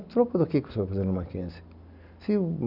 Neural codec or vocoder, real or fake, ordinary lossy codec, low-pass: none; real; none; 5.4 kHz